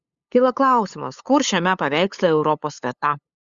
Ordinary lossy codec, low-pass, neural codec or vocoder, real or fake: Opus, 64 kbps; 7.2 kHz; codec, 16 kHz, 8 kbps, FunCodec, trained on LibriTTS, 25 frames a second; fake